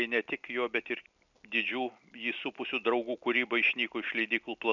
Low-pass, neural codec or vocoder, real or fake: 7.2 kHz; none; real